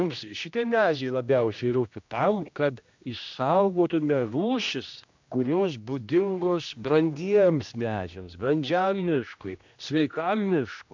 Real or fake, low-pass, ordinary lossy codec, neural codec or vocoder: fake; 7.2 kHz; MP3, 64 kbps; codec, 16 kHz, 1 kbps, X-Codec, HuBERT features, trained on general audio